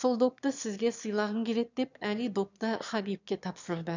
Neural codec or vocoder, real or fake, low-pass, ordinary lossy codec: autoencoder, 22.05 kHz, a latent of 192 numbers a frame, VITS, trained on one speaker; fake; 7.2 kHz; none